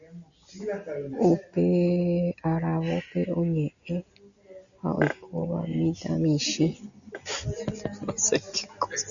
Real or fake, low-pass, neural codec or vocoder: real; 7.2 kHz; none